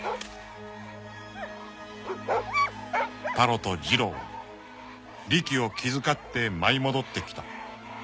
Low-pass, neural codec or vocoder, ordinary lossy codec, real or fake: none; none; none; real